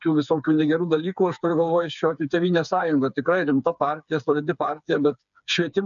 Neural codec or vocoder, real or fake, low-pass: codec, 16 kHz, 4 kbps, FreqCodec, smaller model; fake; 7.2 kHz